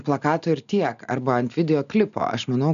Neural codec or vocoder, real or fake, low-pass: none; real; 7.2 kHz